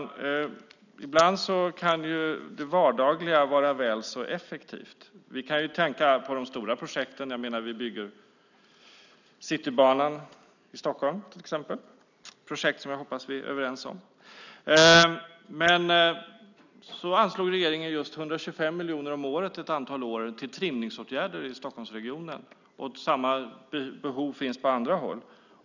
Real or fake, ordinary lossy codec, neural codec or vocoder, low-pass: real; none; none; 7.2 kHz